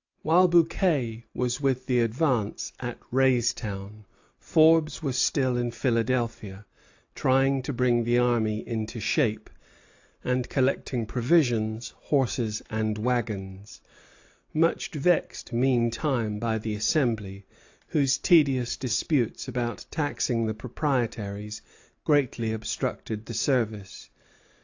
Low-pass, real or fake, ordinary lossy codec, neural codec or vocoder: 7.2 kHz; real; AAC, 48 kbps; none